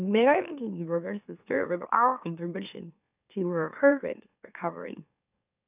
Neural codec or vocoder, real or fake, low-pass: autoencoder, 44.1 kHz, a latent of 192 numbers a frame, MeloTTS; fake; 3.6 kHz